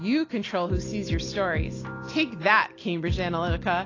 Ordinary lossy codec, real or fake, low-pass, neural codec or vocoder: AAC, 32 kbps; real; 7.2 kHz; none